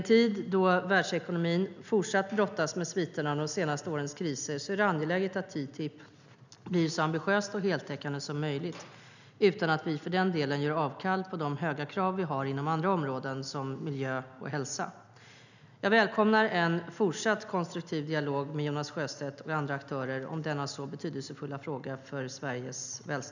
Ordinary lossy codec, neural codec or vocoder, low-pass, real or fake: none; none; 7.2 kHz; real